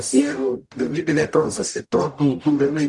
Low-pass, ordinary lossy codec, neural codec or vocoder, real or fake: 10.8 kHz; MP3, 96 kbps; codec, 44.1 kHz, 0.9 kbps, DAC; fake